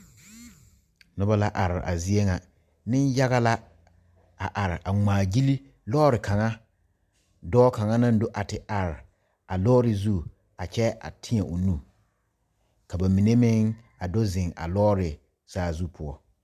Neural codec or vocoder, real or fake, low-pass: none; real; 14.4 kHz